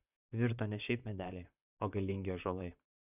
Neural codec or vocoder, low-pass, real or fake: vocoder, 44.1 kHz, 128 mel bands, Pupu-Vocoder; 3.6 kHz; fake